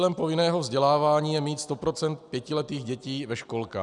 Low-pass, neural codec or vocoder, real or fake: 10.8 kHz; none; real